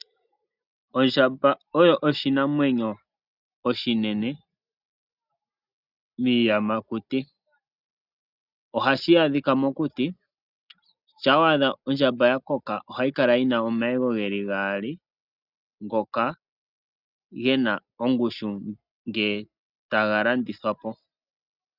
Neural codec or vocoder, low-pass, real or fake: none; 5.4 kHz; real